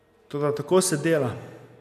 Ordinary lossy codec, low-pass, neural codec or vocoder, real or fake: none; 14.4 kHz; none; real